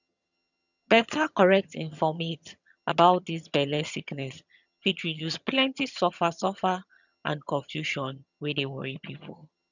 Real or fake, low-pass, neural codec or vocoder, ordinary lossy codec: fake; 7.2 kHz; vocoder, 22.05 kHz, 80 mel bands, HiFi-GAN; none